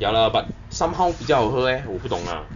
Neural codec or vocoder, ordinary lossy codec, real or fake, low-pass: none; none; real; 7.2 kHz